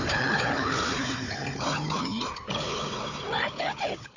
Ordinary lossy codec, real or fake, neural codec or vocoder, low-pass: none; fake; codec, 16 kHz, 4 kbps, FunCodec, trained on Chinese and English, 50 frames a second; 7.2 kHz